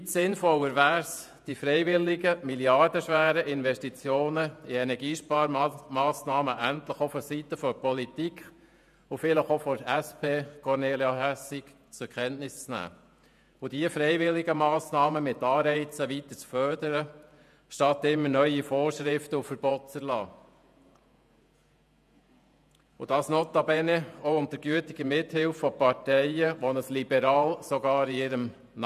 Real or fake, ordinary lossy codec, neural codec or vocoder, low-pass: fake; none; vocoder, 48 kHz, 128 mel bands, Vocos; 14.4 kHz